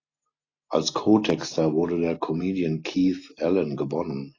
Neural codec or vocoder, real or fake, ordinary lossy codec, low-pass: none; real; AAC, 32 kbps; 7.2 kHz